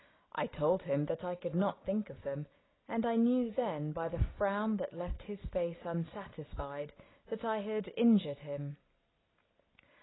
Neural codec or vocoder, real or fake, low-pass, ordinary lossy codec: none; real; 7.2 kHz; AAC, 16 kbps